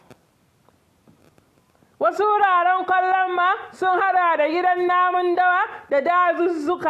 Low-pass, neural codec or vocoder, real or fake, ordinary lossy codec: 14.4 kHz; none; real; AAC, 64 kbps